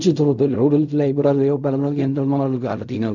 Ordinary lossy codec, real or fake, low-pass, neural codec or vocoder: none; fake; 7.2 kHz; codec, 16 kHz in and 24 kHz out, 0.4 kbps, LongCat-Audio-Codec, fine tuned four codebook decoder